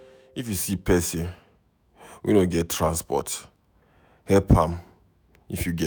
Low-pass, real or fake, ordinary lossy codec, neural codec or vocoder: none; fake; none; autoencoder, 48 kHz, 128 numbers a frame, DAC-VAE, trained on Japanese speech